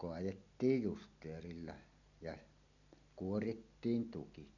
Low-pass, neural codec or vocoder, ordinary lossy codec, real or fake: 7.2 kHz; none; none; real